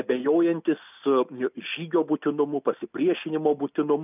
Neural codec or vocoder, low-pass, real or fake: none; 3.6 kHz; real